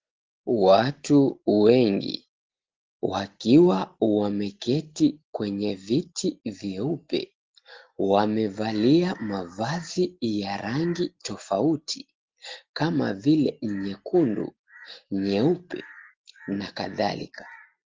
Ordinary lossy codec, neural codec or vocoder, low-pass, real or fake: Opus, 16 kbps; none; 7.2 kHz; real